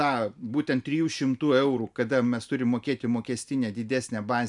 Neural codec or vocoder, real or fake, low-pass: none; real; 10.8 kHz